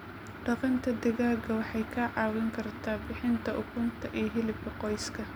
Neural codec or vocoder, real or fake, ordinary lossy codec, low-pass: none; real; none; none